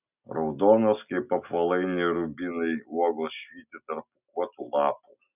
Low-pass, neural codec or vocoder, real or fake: 3.6 kHz; none; real